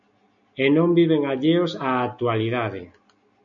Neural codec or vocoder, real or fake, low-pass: none; real; 7.2 kHz